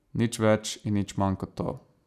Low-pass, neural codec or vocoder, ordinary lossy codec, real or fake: 14.4 kHz; none; none; real